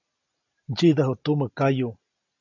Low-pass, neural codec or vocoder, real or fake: 7.2 kHz; none; real